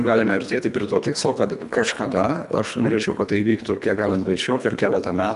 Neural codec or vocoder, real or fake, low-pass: codec, 24 kHz, 1.5 kbps, HILCodec; fake; 10.8 kHz